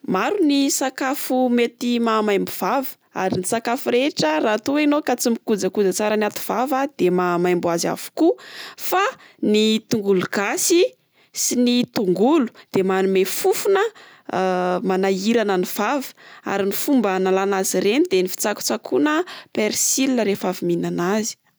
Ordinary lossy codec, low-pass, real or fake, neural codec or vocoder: none; none; real; none